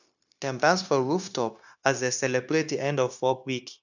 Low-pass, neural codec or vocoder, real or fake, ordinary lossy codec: 7.2 kHz; codec, 16 kHz, 0.9 kbps, LongCat-Audio-Codec; fake; none